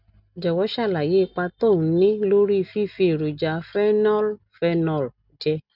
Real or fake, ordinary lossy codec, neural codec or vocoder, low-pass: real; none; none; 5.4 kHz